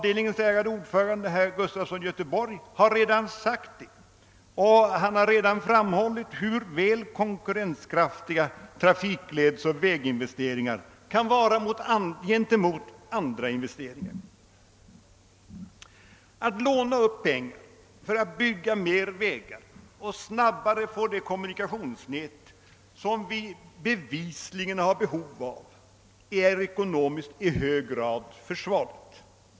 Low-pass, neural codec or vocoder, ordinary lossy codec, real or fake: none; none; none; real